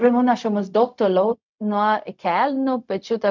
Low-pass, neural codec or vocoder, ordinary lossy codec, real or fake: 7.2 kHz; codec, 16 kHz, 0.4 kbps, LongCat-Audio-Codec; MP3, 64 kbps; fake